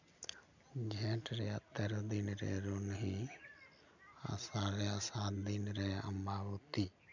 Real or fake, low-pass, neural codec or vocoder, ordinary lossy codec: real; 7.2 kHz; none; none